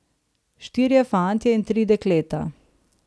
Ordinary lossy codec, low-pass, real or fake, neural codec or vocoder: none; none; real; none